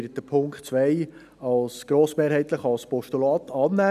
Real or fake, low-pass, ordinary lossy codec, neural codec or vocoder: real; 14.4 kHz; none; none